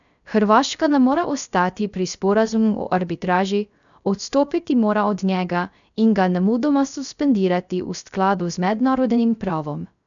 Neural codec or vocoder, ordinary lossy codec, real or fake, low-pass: codec, 16 kHz, 0.3 kbps, FocalCodec; none; fake; 7.2 kHz